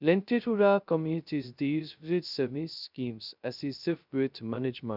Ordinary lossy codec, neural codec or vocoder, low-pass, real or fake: none; codec, 16 kHz, 0.2 kbps, FocalCodec; 5.4 kHz; fake